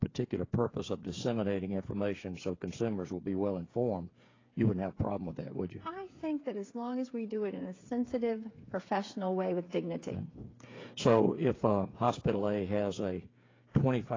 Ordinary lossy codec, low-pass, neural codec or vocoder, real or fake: AAC, 32 kbps; 7.2 kHz; codec, 16 kHz, 8 kbps, FreqCodec, smaller model; fake